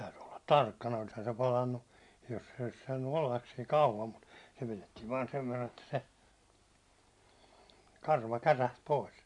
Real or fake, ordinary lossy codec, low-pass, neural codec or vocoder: real; MP3, 64 kbps; 10.8 kHz; none